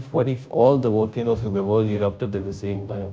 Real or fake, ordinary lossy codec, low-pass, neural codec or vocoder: fake; none; none; codec, 16 kHz, 0.5 kbps, FunCodec, trained on Chinese and English, 25 frames a second